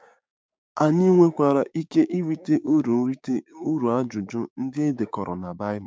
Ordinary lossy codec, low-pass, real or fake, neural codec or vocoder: none; none; fake; codec, 16 kHz, 6 kbps, DAC